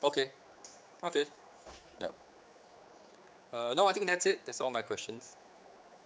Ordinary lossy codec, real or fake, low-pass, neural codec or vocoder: none; fake; none; codec, 16 kHz, 4 kbps, X-Codec, HuBERT features, trained on general audio